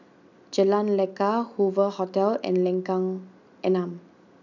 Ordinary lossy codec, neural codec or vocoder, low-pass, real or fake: none; none; 7.2 kHz; real